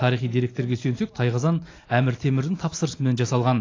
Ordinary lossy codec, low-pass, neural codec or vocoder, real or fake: AAC, 32 kbps; 7.2 kHz; none; real